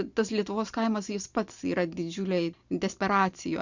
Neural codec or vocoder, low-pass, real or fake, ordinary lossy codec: none; 7.2 kHz; real; Opus, 64 kbps